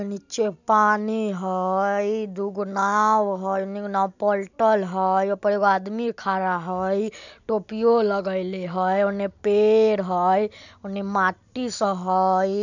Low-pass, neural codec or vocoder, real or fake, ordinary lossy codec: 7.2 kHz; none; real; none